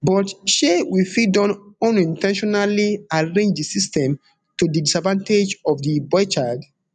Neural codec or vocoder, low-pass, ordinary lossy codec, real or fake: none; 9.9 kHz; none; real